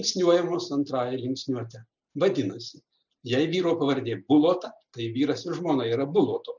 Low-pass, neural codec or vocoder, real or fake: 7.2 kHz; none; real